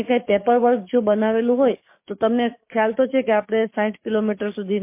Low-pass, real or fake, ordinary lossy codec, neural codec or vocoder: 3.6 kHz; fake; MP3, 24 kbps; codec, 44.1 kHz, 7.8 kbps, DAC